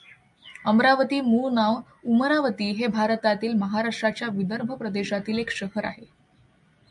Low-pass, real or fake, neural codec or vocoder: 10.8 kHz; real; none